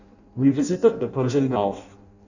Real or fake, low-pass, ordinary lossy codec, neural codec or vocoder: fake; 7.2 kHz; none; codec, 16 kHz in and 24 kHz out, 0.6 kbps, FireRedTTS-2 codec